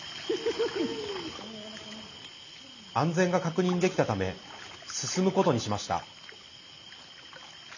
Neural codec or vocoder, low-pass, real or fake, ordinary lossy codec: none; 7.2 kHz; real; none